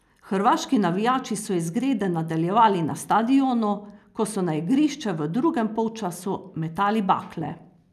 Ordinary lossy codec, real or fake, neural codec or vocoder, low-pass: none; real; none; 14.4 kHz